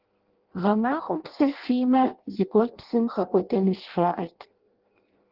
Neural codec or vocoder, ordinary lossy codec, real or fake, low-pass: codec, 16 kHz in and 24 kHz out, 0.6 kbps, FireRedTTS-2 codec; Opus, 16 kbps; fake; 5.4 kHz